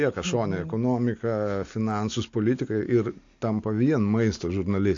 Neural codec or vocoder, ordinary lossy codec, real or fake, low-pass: none; AAC, 48 kbps; real; 7.2 kHz